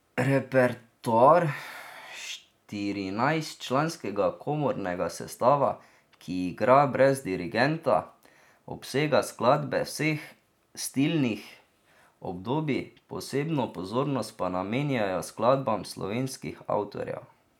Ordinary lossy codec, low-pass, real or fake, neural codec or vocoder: none; 19.8 kHz; real; none